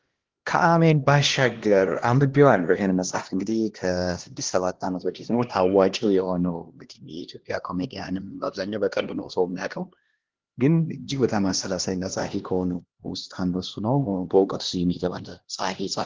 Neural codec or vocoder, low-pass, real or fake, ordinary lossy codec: codec, 16 kHz, 1 kbps, X-Codec, HuBERT features, trained on LibriSpeech; 7.2 kHz; fake; Opus, 16 kbps